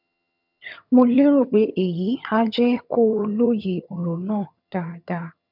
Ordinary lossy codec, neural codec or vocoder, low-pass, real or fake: none; vocoder, 22.05 kHz, 80 mel bands, HiFi-GAN; 5.4 kHz; fake